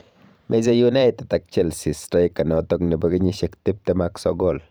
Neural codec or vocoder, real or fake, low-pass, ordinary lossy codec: vocoder, 44.1 kHz, 128 mel bands every 512 samples, BigVGAN v2; fake; none; none